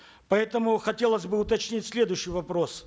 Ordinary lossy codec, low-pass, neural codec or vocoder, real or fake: none; none; none; real